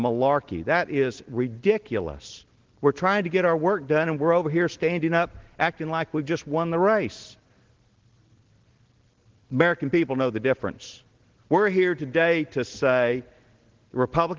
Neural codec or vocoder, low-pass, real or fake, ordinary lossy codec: none; 7.2 kHz; real; Opus, 16 kbps